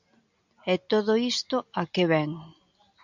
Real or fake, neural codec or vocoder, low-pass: real; none; 7.2 kHz